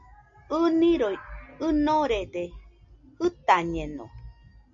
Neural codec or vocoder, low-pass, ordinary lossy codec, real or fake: none; 7.2 kHz; MP3, 64 kbps; real